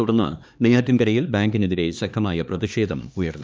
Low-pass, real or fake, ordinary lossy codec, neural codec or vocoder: none; fake; none; codec, 16 kHz, 2 kbps, X-Codec, HuBERT features, trained on LibriSpeech